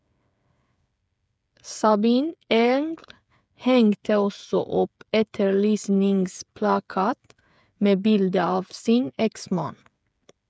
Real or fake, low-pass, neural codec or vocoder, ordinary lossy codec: fake; none; codec, 16 kHz, 8 kbps, FreqCodec, smaller model; none